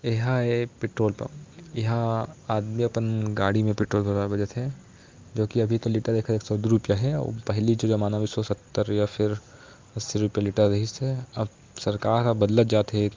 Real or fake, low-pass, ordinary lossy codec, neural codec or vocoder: real; 7.2 kHz; Opus, 24 kbps; none